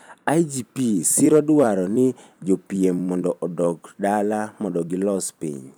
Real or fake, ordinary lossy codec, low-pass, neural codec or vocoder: fake; none; none; vocoder, 44.1 kHz, 128 mel bands every 256 samples, BigVGAN v2